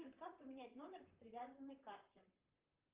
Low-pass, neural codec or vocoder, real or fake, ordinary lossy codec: 3.6 kHz; vocoder, 44.1 kHz, 128 mel bands, Pupu-Vocoder; fake; Opus, 24 kbps